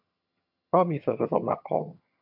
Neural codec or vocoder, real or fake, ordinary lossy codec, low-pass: vocoder, 22.05 kHz, 80 mel bands, HiFi-GAN; fake; AAC, 48 kbps; 5.4 kHz